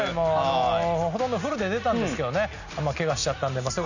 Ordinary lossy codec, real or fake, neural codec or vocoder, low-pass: none; real; none; 7.2 kHz